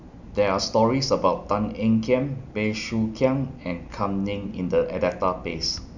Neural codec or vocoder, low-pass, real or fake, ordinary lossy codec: none; 7.2 kHz; real; none